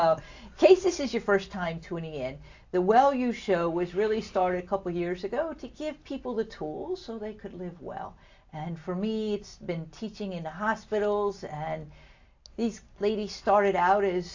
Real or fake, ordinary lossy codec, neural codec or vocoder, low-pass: real; AAC, 48 kbps; none; 7.2 kHz